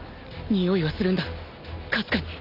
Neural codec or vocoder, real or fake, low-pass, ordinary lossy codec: none; real; 5.4 kHz; none